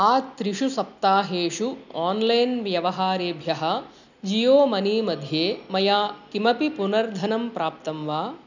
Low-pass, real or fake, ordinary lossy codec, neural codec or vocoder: 7.2 kHz; real; none; none